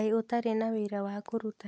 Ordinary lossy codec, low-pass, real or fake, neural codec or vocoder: none; none; real; none